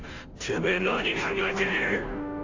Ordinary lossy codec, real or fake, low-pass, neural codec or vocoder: AAC, 48 kbps; fake; 7.2 kHz; codec, 16 kHz, 0.5 kbps, FunCodec, trained on Chinese and English, 25 frames a second